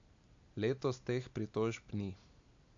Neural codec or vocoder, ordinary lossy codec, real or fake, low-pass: none; AAC, 64 kbps; real; 7.2 kHz